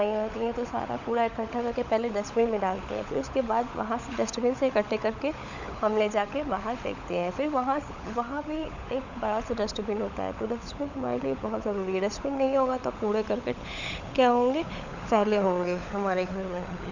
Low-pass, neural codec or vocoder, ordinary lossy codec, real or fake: 7.2 kHz; codec, 16 kHz, 16 kbps, FunCodec, trained on LibriTTS, 50 frames a second; none; fake